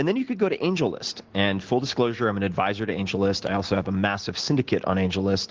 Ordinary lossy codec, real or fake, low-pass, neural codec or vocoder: Opus, 16 kbps; real; 7.2 kHz; none